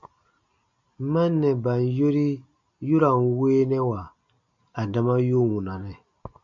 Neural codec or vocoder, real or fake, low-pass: none; real; 7.2 kHz